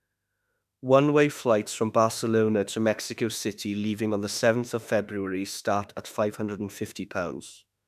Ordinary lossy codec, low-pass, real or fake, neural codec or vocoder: none; 14.4 kHz; fake; autoencoder, 48 kHz, 32 numbers a frame, DAC-VAE, trained on Japanese speech